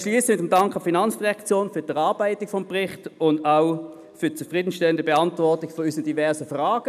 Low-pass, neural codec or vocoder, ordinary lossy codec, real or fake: 14.4 kHz; none; none; real